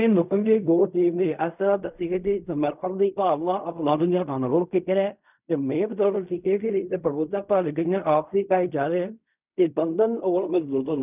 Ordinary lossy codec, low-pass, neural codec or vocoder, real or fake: none; 3.6 kHz; codec, 16 kHz in and 24 kHz out, 0.4 kbps, LongCat-Audio-Codec, fine tuned four codebook decoder; fake